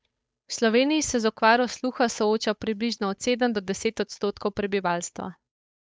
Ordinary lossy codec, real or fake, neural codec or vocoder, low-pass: none; fake; codec, 16 kHz, 8 kbps, FunCodec, trained on Chinese and English, 25 frames a second; none